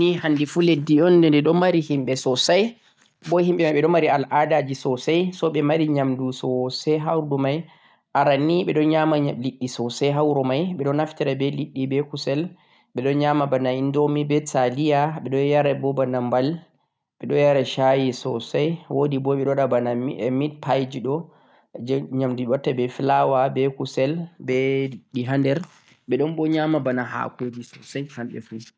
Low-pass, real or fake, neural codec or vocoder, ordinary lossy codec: none; real; none; none